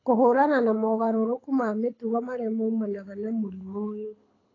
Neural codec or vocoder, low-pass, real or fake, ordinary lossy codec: codec, 24 kHz, 6 kbps, HILCodec; 7.2 kHz; fake; AAC, 32 kbps